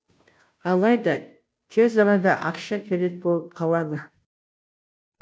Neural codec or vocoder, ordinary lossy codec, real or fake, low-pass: codec, 16 kHz, 0.5 kbps, FunCodec, trained on Chinese and English, 25 frames a second; none; fake; none